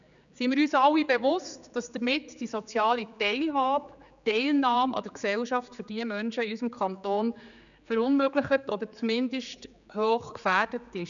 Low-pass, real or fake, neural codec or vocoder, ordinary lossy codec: 7.2 kHz; fake; codec, 16 kHz, 4 kbps, X-Codec, HuBERT features, trained on general audio; none